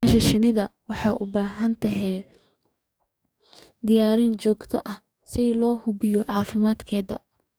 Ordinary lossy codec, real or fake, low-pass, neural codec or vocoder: none; fake; none; codec, 44.1 kHz, 2.6 kbps, DAC